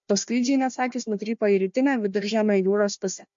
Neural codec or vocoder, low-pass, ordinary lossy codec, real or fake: codec, 16 kHz, 1 kbps, FunCodec, trained on Chinese and English, 50 frames a second; 7.2 kHz; MP3, 48 kbps; fake